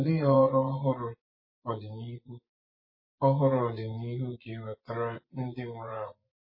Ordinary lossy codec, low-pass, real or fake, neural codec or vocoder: MP3, 24 kbps; 5.4 kHz; fake; vocoder, 24 kHz, 100 mel bands, Vocos